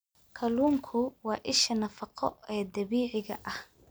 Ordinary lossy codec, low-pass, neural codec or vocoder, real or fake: none; none; none; real